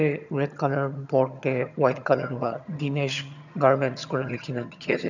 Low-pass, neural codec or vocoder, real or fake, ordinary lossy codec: 7.2 kHz; vocoder, 22.05 kHz, 80 mel bands, HiFi-GAN; fake; none